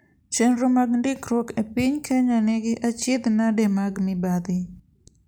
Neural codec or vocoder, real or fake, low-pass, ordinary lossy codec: none; real; none; none